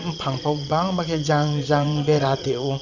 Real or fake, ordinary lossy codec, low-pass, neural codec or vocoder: fake; none; 7.2 kHz; vocoder, 22.05 kHz, 80 mel bands, WaveNeXt